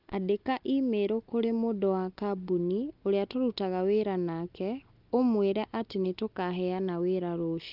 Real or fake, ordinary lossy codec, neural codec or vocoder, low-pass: real; Opus, 24 kbps; none; 5.4 kHz